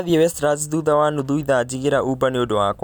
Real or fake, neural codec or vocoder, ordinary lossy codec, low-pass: real; none; none; none